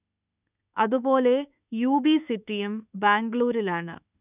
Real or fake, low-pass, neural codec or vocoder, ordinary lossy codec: fake; 3.6 kHz; autoencoder, 48 kHz, 32 numbers a frame, DAC-VAE, trained on Japanese speech; none